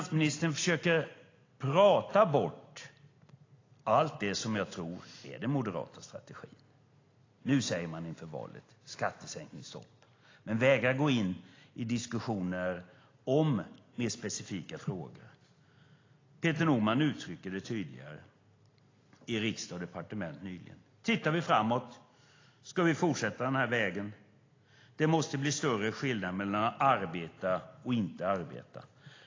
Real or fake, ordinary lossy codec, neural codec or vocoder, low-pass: real; AAC, 32 kbps; none; 7.2 kHz